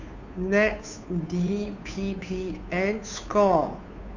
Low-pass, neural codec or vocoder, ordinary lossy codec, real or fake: 7.2 kHz; codec, 16 kHz, 2 kbps, FunCodec, trained on Chinese and English, 25 frames a second; none; fake